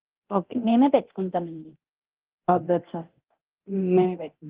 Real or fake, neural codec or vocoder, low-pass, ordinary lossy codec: fake; codec, 24 kHz, 0.9 kbps, DualCodec; 3.6 kHz; Opus, 16 kbps